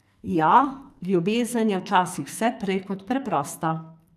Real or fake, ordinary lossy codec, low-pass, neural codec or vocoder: fake; none; 14.4 kHz; codec, 44.1 kHz, 2.6 kbps, SNAC